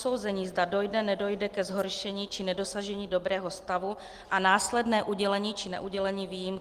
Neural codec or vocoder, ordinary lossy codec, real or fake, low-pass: none; Opus, 24 kbps; real; 14.4 kHz